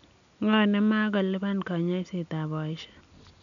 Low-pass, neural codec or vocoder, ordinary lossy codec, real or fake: 7.2 kHz; none; none; real